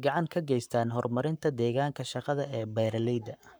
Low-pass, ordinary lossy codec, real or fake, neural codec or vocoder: none; none; fake; codec, 44.1 kHz, 7.8 kbps, Pupu-Codec